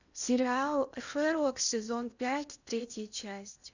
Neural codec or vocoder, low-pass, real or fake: codec, 16 kHz in and 24 kHz out, 0.8 kbps, FocalCodec, streaming, 65536 codes; 7.2 kHz; fake